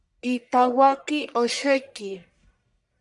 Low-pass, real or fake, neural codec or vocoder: 10.8 kHz; fake; codec, 44.1 kHz, 1.7 kbps, Pupu-Codec